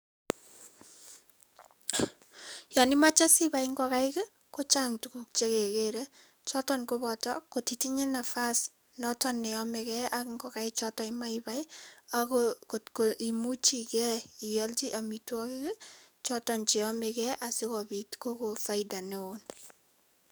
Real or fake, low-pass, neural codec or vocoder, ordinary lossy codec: fake; none; codec, 44.1 kHz, 7.8 kbps, DAC; none